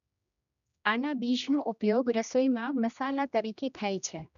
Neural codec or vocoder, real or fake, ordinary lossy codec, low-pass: codec, 16 kHz, 1 kbps, X-Codec, HuBERT features, trained on general audio; fake; none; 7.2 kHz